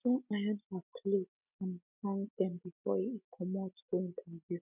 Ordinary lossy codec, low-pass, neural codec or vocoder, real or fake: none; 3.6 kHz; none; real